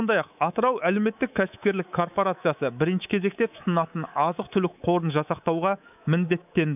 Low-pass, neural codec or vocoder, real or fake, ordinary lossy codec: 3.6 kHz; codec, 24 kHz, 3.1 kbps, DualCodec; fake; none